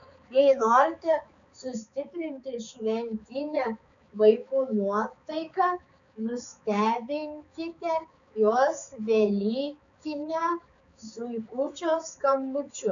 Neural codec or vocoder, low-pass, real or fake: codec, 16 kHz, 4 kbps, X-Codec, HuBERT features, trained on balanced general audio; 7.2 kHz; fake